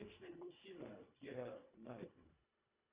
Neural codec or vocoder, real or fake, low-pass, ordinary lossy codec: codec, 24 kHz, 1.5 kbps, HILCodec; fake; 3.6 kHz; Opus, 64 kbps